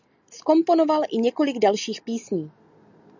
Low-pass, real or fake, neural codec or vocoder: 7.2 kHz; real; none